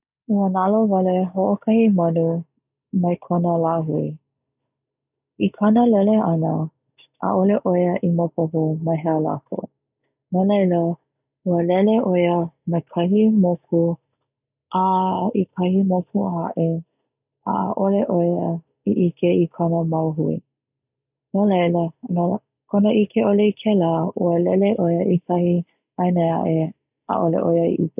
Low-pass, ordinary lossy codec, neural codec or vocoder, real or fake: 3.6 kHz; none; none; real